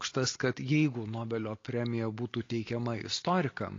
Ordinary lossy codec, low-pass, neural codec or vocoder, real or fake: AAC, 32 kbps; 7.2 kHz; none; real